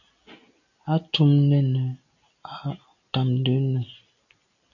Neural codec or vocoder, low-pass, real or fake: none; 7.2 kHz; real